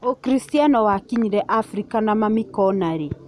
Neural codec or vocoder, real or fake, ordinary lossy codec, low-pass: none; real; none; none